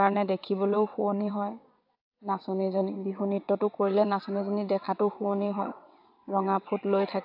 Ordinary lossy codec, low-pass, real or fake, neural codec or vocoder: AAC, 48 kbps; 5.4 kHz; fake; vocoder, 22.05 kHz, 80 mel bands, WaveNeXt